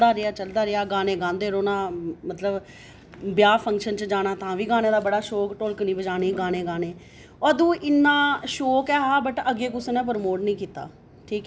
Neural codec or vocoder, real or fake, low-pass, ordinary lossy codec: none; real; none; none